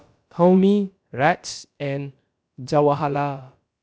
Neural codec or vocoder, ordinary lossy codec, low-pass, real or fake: codec, 16 kHz, about 1 kbps, DyCAST, with the encoder's durations; none; none; fake